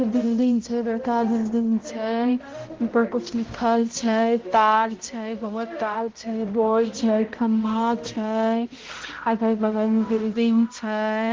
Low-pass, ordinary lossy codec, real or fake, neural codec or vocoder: 7.2 kHz; Opus, 16 kbps; fake; codec, 16 kHz, 0.5 kbps, X-Codec, HuBERT features, trained on balanced general audio